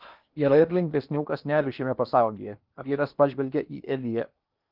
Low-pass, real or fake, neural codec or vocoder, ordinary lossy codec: 5.4 kHz; fake; codec, 16 kHz in and 24 kHz out, 0.8 kbps, FocalCodec, streaming, 65536 codes; Opus, 32 kbps